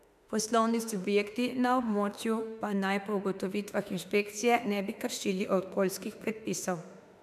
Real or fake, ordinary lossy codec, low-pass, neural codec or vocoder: fake; none; 14.4 kHz; autoencoder, 48 kHz, 32 numbers a frame, DAC-VAE, trained on Japanese speech